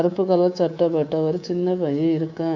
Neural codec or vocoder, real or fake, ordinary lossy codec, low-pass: codec, 24 kHz, 3.1 kbps, DualCodec; fake; none; 7.2 kHz